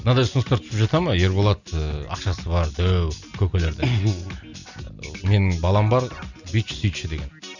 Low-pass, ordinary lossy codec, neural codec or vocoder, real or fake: 7.2 kHz; none; none; real